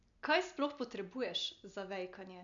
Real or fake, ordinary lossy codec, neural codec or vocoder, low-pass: real; MP3, 64 kbps; none; 7.2 kHz